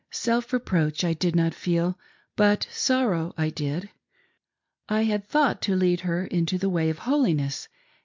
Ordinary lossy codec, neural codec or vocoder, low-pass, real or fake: MP3, 64 kbps; none; 7.2 kHz; real